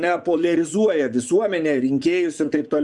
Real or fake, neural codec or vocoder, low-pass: fake; vocoder, 24 kHz, 100 mel bands, Vocos; 10.8 kHz